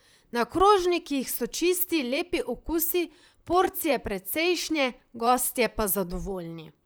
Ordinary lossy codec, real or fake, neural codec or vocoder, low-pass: none; fake; vocoder, 44.1 kHz, 128 mel bands, Pupu-Vocoder; none